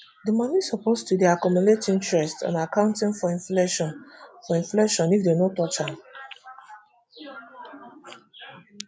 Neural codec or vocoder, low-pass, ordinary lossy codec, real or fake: none; none; none; real